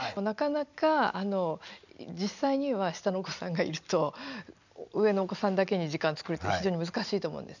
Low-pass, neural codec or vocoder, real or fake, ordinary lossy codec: 7.2 kHz; none; real; none